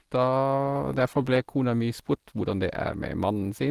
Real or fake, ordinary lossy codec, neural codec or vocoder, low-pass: real; Opus, 16 kbps; none; 14.4 kHz